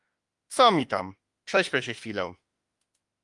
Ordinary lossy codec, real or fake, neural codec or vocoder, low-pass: Opus, 32 kbps; fake; autoencoder, 48 kHz, 32 numbers a frame, DAC-VAE, trained on Japanese speech; 10.8 kHz